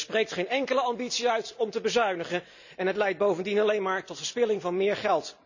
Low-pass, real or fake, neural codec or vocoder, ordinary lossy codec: 7.2 kHz; real; none; none